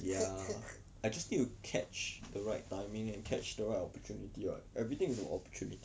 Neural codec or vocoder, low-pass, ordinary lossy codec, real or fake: none; none; none; real